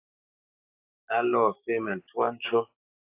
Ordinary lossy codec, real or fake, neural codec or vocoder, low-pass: AAC, 24 kbps; fake; codec, 16 kHz, 6 kbps, DAC; 3.6 kHz